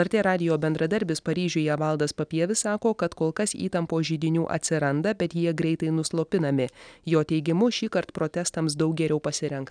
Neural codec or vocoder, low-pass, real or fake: none; 9.9 kHz; real